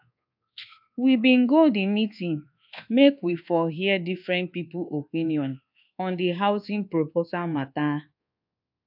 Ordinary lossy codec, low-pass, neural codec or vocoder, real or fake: AAC, 48 kbps; 5.4 kHz; codec, 24 kHz, 1.2 kbps, DualCodec; fake